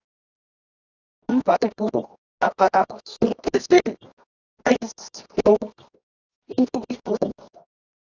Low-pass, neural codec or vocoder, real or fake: 7.2 kHz; codec, 24 kHz, 0.9 kbps, WavTokenizer, medium music audio release; fake